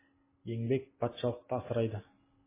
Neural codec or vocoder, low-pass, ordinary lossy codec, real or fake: none; 3.6 kHz; MP3, 16 kbps; real